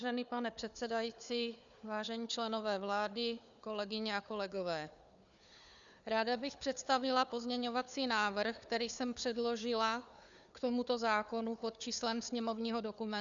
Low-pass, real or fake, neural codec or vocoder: 7.2 kHz; fake; codec, 16 kHz, 4 kbps, FunCodec, trained on Chinese and English, 50 frames a second